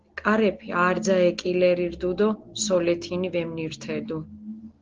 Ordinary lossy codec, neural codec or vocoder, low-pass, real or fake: Opus, 16 kbps; none; 7.2 kHz; real